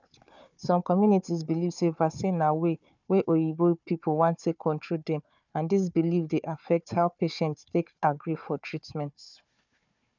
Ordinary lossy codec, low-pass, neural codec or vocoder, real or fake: none; 7.2 kHz; codec, 16 kHz, 4 kbps, FunCodec, trained on Chinese and English, 50 frames a second; fake